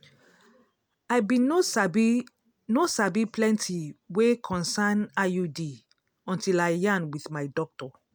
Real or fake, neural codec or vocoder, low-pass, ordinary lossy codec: real; none; none; none